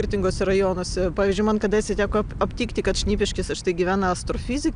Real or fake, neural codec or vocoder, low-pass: real; none; 9.9 kHz